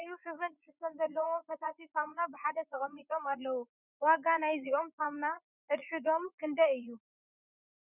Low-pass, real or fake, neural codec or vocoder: 3.6 kHz; fake; vocoder, 44.1 kHz, 80 mel bands, Vocos